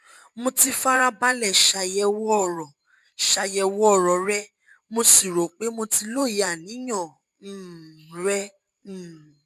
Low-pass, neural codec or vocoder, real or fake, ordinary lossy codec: 14.4 kHz; vocoder, 44.1 kHz, 128 mel bands every 512 samples, BigVGAN v2; fake; none